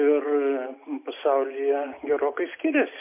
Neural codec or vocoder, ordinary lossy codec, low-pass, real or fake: none; MP3, 32 kbps; 3.6 kHz; real